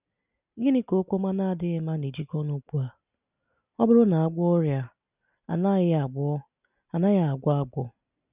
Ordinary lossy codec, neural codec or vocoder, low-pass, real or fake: none; none; 3.6 kHz; real